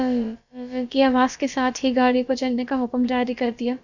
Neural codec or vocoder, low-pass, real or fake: codec, 16 kHz, about 1 kbps, DyCAST, with the encoder's durations; 7.2 kHz; fake